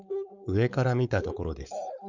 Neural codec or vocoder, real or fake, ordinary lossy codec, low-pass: codec, 16 kHz, 4.8 kbps, FACodec; fake; none; 7.2 kHz